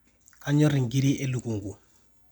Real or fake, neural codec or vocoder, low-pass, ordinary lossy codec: real; none; 19.8 kHz; none